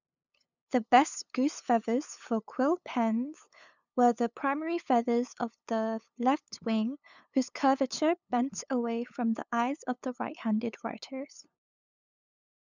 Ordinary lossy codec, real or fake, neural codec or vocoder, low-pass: none; fake; codec, 16 kHz, 8 kbps, FunCodec, trained on LibriTTS, 25 frames a second; 7.2 kHz